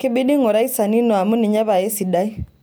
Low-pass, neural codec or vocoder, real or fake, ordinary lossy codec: none; none; real; none